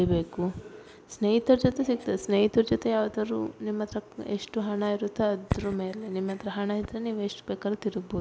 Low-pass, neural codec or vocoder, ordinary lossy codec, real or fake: none; none; none; real